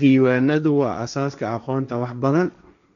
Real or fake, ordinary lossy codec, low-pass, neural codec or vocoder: fake; none; 7.2 kHz; codec, 16 kHz, 1.1 kbps, Voila-Tokenizer